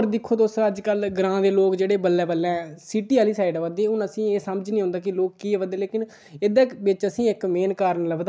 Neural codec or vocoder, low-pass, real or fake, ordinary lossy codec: none; none; real; none